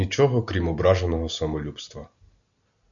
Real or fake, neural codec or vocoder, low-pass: real; none; 7.2 kHz